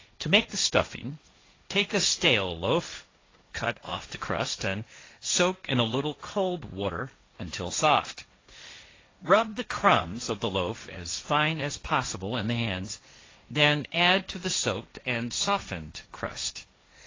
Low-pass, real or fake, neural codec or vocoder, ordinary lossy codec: 7.2 kHz; fake; codec, 16 kHz, 1.1 kbps, Voila-Tokenizer; AAC, 32 kbps